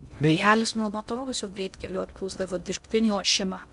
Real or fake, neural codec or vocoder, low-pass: fake; codec, 16 kHz in and 24 kHz out, 0.6 kbps, FocalCodec, streaming, 2048 codes; 10.8 kHz